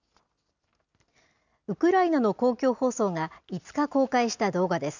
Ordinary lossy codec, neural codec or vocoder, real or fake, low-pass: none; none; real; 7.2 kHz